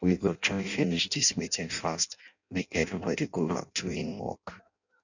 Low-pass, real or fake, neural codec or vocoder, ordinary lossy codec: 7.2 kHz; fake; codec, 16 kHz in and 24 kHz out, 0.6 kbps, FireRedTTS-2 codec; none